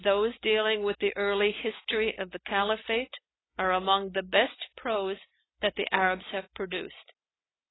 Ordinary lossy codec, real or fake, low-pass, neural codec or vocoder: AAC, 16 kbps; real; 7.2 kHz; none